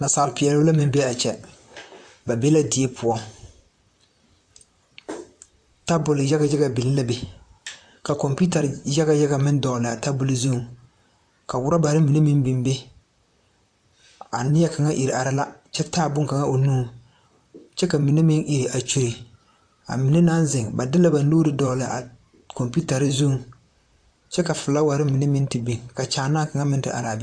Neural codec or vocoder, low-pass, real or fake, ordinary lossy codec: vocoder, 44.1 kHz, 128 mel bands, Pupu-Vocoder; 9.9 kHz; fake; AAC, 64 kbps